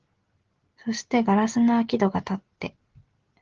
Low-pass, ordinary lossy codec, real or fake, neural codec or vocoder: 7.2 kHz; Opus, 16 kbps; real; none